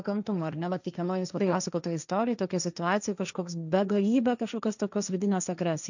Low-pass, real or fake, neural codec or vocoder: 7.2 kHz; fake; codec, 16 kHz, 1.1 kbps, Voila-Tokenizer